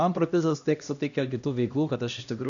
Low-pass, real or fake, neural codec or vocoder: 7.2 kHz; fake; codec, 16 kHz, 1 kbps, X-Codec, HuBERT features, trained on LibriSpeech